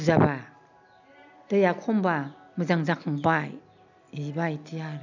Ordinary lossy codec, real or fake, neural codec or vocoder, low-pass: none; real; none; 7.2 kHz